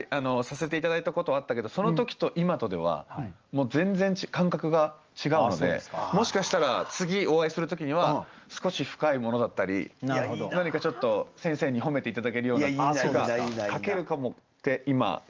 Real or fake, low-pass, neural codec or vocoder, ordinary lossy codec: real; 7.2 kHz; none; Opus, 24 kbps